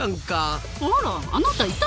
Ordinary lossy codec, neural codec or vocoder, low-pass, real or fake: none; none; none; real